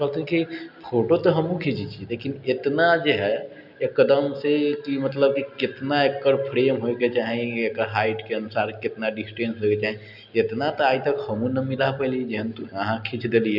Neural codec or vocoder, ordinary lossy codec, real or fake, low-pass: none; none; real; 5.4 kHz